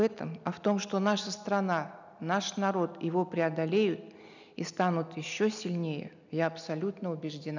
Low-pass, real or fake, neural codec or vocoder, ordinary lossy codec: 7.2 kHz; real; none; none